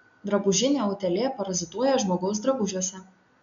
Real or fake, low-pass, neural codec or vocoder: real; 7.2 kHz; none